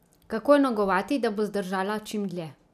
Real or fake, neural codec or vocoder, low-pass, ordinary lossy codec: real; none; 14.4 kHz; none